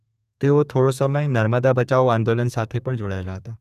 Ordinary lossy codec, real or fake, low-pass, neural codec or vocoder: none; fake; 14.4 kHz; codec, 32 kHz, 1.9 kbps, SNAC